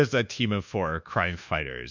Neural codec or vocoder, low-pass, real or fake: codec, 24 kHz, 1.2 kbps, DualCodec; 7.2 kHz; fake